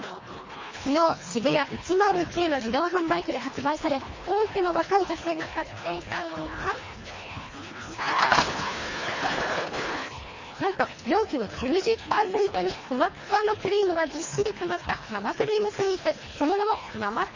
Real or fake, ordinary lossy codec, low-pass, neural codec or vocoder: fake; MP3, 32 kbps; 7.2 kHz; codec, 24 kHz, 1.5 kbps, HILCodec